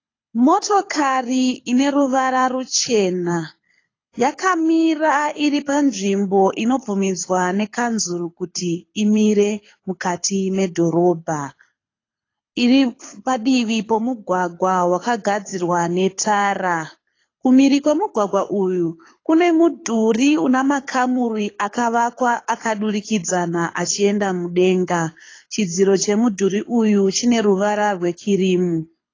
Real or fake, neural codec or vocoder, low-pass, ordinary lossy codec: fake; codec, 24 kHz, 6 kbps, HILCodec; 7.2 kHz; AAC, 32 kbps